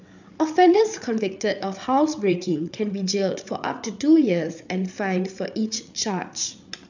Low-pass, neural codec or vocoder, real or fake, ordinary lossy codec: 7.2 kHz; codec, 16 kHz, 8 kbps, FreqCodec, larger model; fake; none